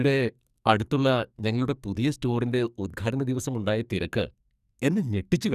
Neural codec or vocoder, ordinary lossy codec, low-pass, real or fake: codec, 44.1 kHz, 2.6 kbps, SNAC; none; 14.4 kHz; fake